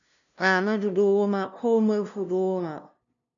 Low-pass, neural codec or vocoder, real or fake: 7.2 kHz; codec, 16 kHz, 0.5 kbps, FunCodec, trained on LibriTTS, 25 frames a second; fake